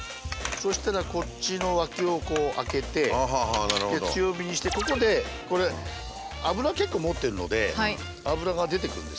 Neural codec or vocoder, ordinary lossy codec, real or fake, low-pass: none; none; real; none